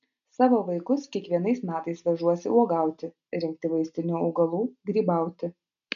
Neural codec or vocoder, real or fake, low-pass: none; real; 7.2 kHz